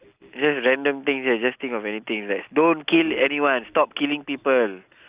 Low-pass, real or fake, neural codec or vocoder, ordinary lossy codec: 3.6 kHz; real; none; Opus, 64 kbps